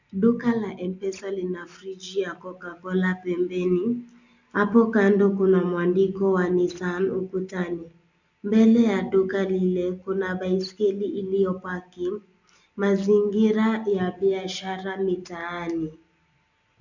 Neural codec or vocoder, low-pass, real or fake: none; 7.2 kHz; real